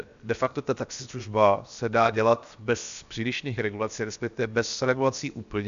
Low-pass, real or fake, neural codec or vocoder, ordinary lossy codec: 7.2 kHz; fake; codec, 16 kHz, 0.7 kbps, FocalCodec; MP3, 64 kbps